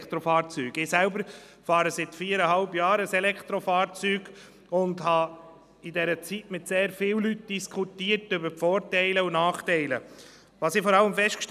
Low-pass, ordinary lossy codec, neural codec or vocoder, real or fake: 14.4 kHz; none; none; real